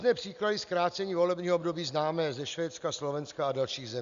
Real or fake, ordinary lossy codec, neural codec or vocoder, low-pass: real; Opus, 64 kbps; none; 7.2 kHz